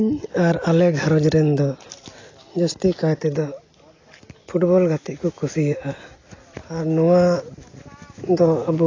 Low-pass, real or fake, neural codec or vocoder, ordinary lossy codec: 7.2 kHz; real; none; AAC, 32 kbps